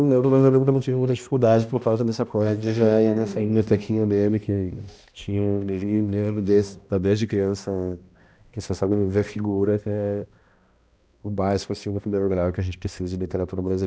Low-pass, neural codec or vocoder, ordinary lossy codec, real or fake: none; codec, 16 kHz, 1 kbps, X-Codec, HuBERT features, trained on balanced general audio; none; fake